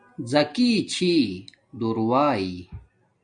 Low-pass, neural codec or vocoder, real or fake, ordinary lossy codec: 9.9 kHz; none; real; MP3, 64 kbps